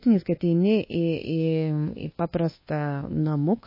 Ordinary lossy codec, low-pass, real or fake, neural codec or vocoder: MP3, 24 kbps; 5.4 kHz; fake; codec, 24 kHz, 1.2 kbps, DualCodec